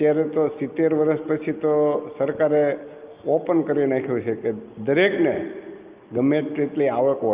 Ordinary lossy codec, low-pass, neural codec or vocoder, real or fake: Opus, 32 kbps; 3.6 kHz; none; real